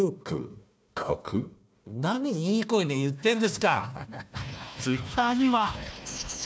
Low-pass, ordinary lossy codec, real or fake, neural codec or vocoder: none; none; fake; codec, 16 kHz, 1 kbps, FunCodec, trained on Chinese and English, 50 frames a second